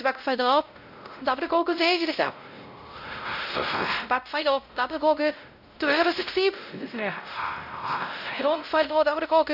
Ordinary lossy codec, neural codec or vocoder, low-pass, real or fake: none; codec, 16 kHz, 0.5 kbps, X-Codec, WavLM features, trained on Multilingual LibriSpeech; 5.4 kHz; fake